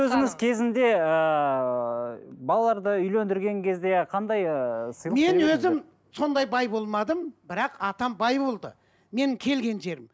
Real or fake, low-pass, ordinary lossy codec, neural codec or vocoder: real; none; none; none